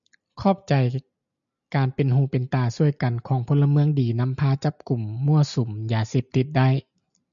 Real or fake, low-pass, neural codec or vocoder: real; 7.2 kHz; none